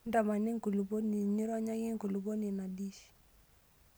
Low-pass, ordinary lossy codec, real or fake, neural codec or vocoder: none; none; real; none